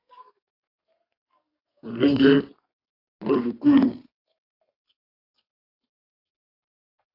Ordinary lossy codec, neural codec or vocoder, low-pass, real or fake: AAC, 32 kbps; codec, 32 kHz, 1.9 kbps, SNAC; 5.4 kHz; fake